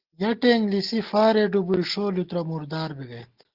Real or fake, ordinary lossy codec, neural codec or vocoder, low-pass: real; Opus, 16 kbps; none; 5.4 kHz